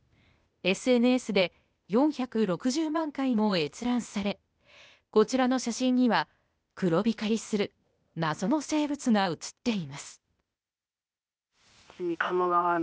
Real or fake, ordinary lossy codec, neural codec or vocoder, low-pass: fake; none; codec, 16 kHz, 0.8 kbps, ZipCodec; none